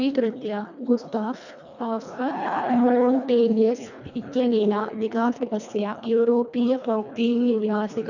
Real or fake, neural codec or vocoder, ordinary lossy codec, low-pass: fake; codec, 24 kHz, 1.5 kbps, HILCodec; none; 7.2 kHz